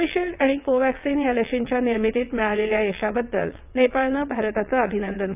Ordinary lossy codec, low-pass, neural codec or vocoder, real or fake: none; 3.6 kHz; vocoder, 22.05 kHz, 80 mel bands, WaveNeXt; fake